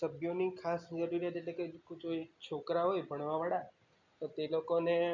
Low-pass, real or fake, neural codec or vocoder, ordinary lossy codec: 7.2 kHz; real; none; none